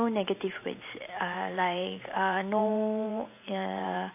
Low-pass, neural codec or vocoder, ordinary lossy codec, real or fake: 3.6 kHz; vocoder, 44.1 kHz, 128 mel bands every 512 samples, BigVGAN v2; AAC, 32 kbps; fake